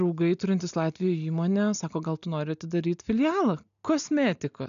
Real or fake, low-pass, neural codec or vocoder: real; 7.2 kHz; none